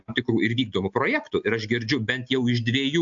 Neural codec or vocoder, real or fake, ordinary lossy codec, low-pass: none; real; AAC, 64 kbps; 7.2 kHz